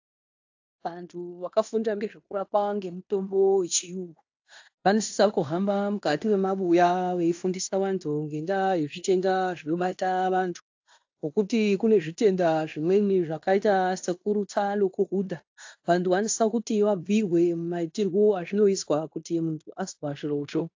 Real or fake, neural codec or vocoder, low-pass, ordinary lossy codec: fake; codec, 16 kHz in and 24 kHz out, 0.9 kbps, LongCat-Audio-Codec, fine tuned four codebook decoder; 7.2 kHz; AAC, 48 kbps